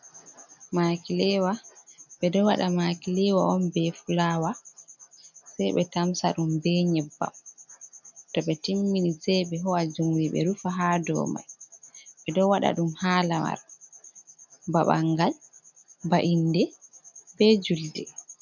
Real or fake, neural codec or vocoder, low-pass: real; none; 7.2 kHz